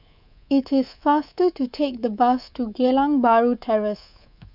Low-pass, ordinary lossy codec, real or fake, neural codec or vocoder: 5.4 kHz; none; fake; codec, 24 kHz, 3.1 kbps, DualCodec